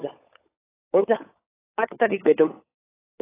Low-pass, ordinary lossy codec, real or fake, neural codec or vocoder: 3.6 kHz; AAC, 16 kbps; fake; codec, 16 kHz, 16 kbps, FunCodec, trained on LibriTTS, 50 frames a second